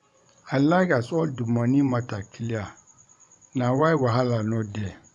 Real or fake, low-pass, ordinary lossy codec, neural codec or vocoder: fake; 10.8 kHz; none; vocoder, 24 kHz, 100 mel bands, Vocos